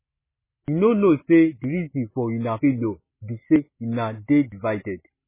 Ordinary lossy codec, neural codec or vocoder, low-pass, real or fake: MP3, 16 kbps; none; 3.6 kHz; real